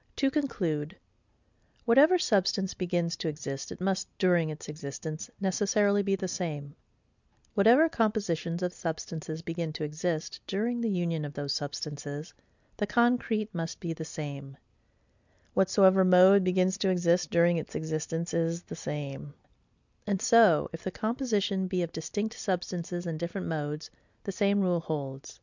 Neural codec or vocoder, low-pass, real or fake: none; 7.2 kHz; real